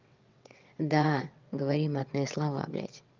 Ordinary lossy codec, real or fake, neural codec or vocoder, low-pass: Opus, 24 kbps; fake; vocoder, 22.05 kHz, 80 mel bands, WaveNeXt; 7.2 kHz